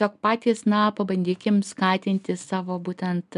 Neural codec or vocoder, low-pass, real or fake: none; 10.8 kHz; real